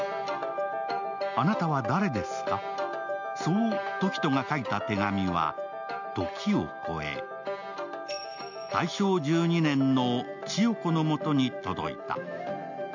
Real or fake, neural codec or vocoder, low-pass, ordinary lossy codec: real; none; 7.2 kHz; none